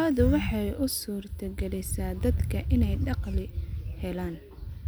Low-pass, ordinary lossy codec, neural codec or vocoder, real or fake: none; none; none; real